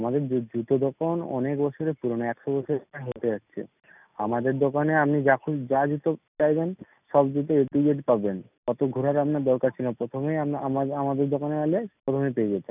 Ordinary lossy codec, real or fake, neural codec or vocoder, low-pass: none; real; none; 3.6 kHz